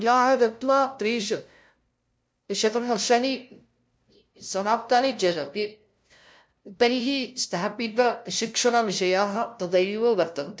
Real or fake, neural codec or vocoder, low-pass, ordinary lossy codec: fake; codec, 16 kHz, 0.5 kbps, FunCodec, trained on LibriTTS, 25 frames a second; none; none